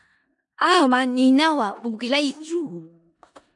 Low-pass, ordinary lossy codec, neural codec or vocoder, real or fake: 10.8 kHz; AAC, 64 kbps; codec, 16 kHz in and 24 kHz out, 0.4 kbps, LongCat-Audio-Codec, four codebook decoder; fake